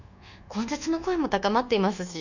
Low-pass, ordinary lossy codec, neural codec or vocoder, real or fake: 7.2 kHz; none; codec, 24 kHz, 1.2 kbps, DualCodec; fake